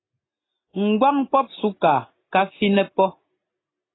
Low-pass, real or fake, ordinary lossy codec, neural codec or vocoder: 7.2 kHz; real; AAC, 16 kbps; none